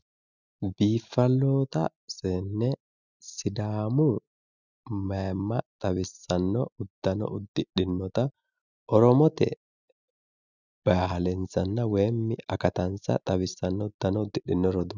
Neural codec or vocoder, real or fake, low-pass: none; real; 7.2 kHz